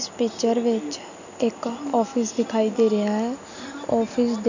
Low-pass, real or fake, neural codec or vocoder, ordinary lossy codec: 7.2 kHz; real; none; none